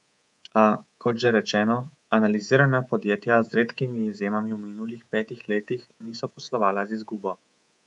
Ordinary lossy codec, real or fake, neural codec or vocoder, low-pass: none; fake; codec, 24 kHz, 3.1 kbps, DualCodec; 10.8 kHz